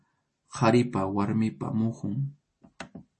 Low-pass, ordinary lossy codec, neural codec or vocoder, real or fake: 10.8 kHz; MP3, 32 kbps; none; real